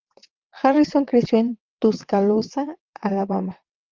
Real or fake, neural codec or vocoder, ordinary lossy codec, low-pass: fake; vocoder, 22.05 kHz, 80 mel bands, WaveNeXt; Opus, 24 kbps; 7.2 kHz